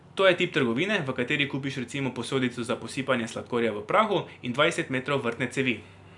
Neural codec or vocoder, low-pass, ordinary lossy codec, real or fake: none; 10.8 kHz; none; real